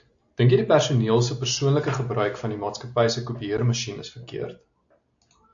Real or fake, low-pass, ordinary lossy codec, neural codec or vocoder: real; 7.2 kHz; AAC, 64 kbps; none